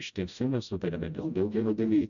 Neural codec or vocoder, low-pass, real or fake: codec, 16 kHz, 0.5 kbps, FreqCodec, smaller model; 7.2 kHz; fake